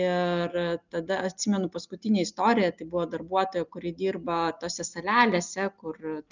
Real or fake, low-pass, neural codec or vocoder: real; 7.2 kHz; none